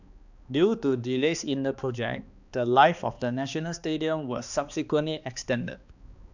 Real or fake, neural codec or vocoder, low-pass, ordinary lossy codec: fake; codec, 16 kHz, 2 kbps, X-Codec, HuBERT features, trained on balanced general audio; 7.2 kHz; none